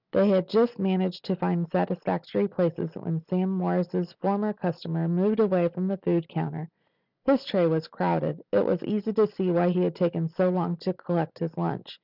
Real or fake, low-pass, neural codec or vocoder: real; 5.4 kHz; none